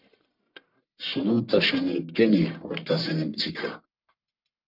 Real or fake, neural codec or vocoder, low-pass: fake; codec, 44.1 kHz, 1.7 kbps, Pupu-Codec; 5.4 kHz